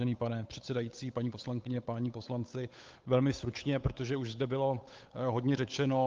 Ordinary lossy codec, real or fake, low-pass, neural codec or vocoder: Opus, 32 kbps; fake; 7.2 kHz; codec, 16 kHz, 8 kbps, FunCodec, trained on Chinese and English, 25 frames a second